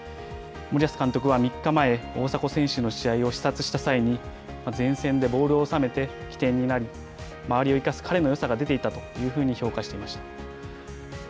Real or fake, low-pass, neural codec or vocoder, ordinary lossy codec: real; none; none; none